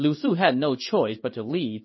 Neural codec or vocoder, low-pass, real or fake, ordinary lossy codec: codec, 16 kHz in and 24 kHz out, 1 kbps, XY-Tokenizer; 7.2 kHz; fake; MP3, 24 kbps